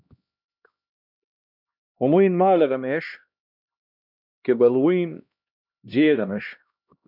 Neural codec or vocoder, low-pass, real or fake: codec, 16 kHz, 1 kbps, X-Codec, HuBERT features, trained on LibriSpeech; 5.4 kHz; fake